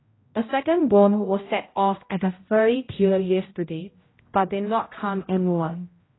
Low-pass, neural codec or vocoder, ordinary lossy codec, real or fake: 7.2 kHz; codec, 16 kHz, 0.5 kbps, X-Codec, HuBERT features, trained on general audio; AAC, 16 kbps; fake